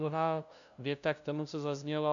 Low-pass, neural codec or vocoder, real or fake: 7.2 kHz; codec, 16 kHz, 0.5 kbps, FunCodec, trained on LibriTTS, 25 frames a second; fake